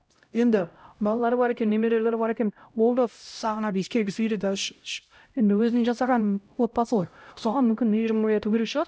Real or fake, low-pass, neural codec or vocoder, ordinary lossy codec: fake; none; codec, 16 kHz, 0.5 kbps, X-Codec, HuBERT features, trained on LibriSpeech; none